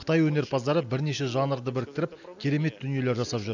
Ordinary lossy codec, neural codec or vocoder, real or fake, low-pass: none; none; real; 7.2 kHz